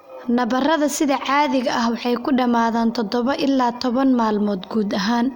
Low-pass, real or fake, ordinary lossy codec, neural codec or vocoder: 19.8 kHz; real; Opus, 64 kbps; none